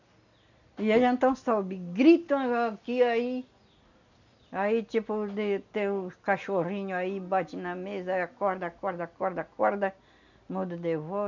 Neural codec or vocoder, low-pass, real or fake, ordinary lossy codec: none; 7.2 kHz; real; none